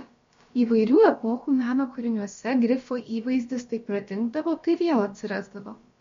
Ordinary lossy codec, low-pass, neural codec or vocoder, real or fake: MP3, 48 kbps; 7.2 kHz; codec, 16 kHz, about 1 kbps, DyCAST, with the encoder's durations; fake